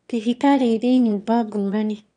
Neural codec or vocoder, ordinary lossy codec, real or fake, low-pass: autoencoder, 22.05 kHz, a latent of 192 numbers a frame, VITS, trained on one speaker; none; fake; 9.9 kHz